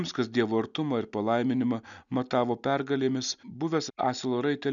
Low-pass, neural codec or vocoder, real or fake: 7.2 kHz; none; real